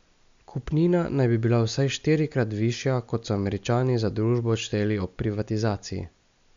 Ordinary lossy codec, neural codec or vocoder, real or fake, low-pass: MP3, 64 kbps; none; real; 7.2 kHz